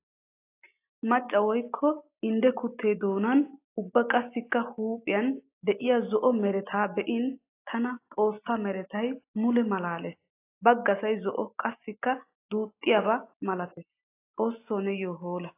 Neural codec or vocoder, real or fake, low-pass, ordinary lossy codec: none; real; 3.6 kHz; AAC, 24 kbps